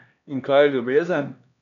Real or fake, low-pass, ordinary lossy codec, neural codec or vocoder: fake; 7.2 kHz; none; codec, 16 kHz, 2 kbps, X-Codec, HuBERT features, trained on LibriSpeech